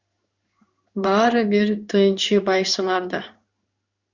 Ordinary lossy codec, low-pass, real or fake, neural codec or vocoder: Opus, 64 kbps; 7.2 kHz; fake; codec, 16 kHz in and 24 kHz out, 1 kbps, XY-Tokenizer